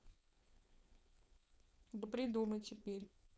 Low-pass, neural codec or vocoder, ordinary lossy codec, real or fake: none; codec, 16 kHz, 4.8 kbps, FACodec; none; fake